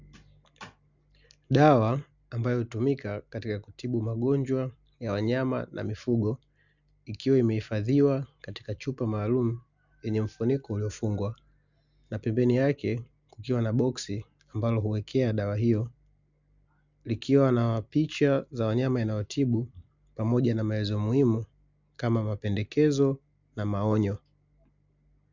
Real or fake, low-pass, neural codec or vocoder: real; 7.2 kHz; none